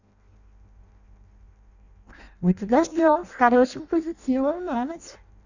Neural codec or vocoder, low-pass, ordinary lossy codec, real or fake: codec, 16 kHz in and 24 kHz out, 0.6 kbps, FireRedTTS-2 codec; 7.2 kHz; none; fake